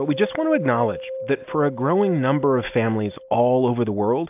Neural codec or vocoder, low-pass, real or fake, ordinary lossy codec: none; 3.6 kHz; real; AAC, 32 kbps